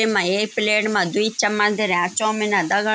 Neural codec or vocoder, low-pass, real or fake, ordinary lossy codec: none; none; real; none